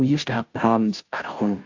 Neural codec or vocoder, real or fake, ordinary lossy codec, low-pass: codec, 16 kHz, 0.5 kbps, FunCodec, trained on Chinese and English, 25 frames a second; fake; AAC, 48 kbps; 7.2 kHz